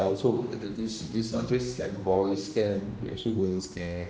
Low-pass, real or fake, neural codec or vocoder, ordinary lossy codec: none; fake; codec, 16 kHz, 1 kbps, X-Codec, HuBERT features, trained on general audio; none